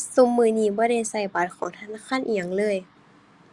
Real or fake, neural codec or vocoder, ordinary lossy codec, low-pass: real; none; Opus, 64 kbps; 10.8 kHz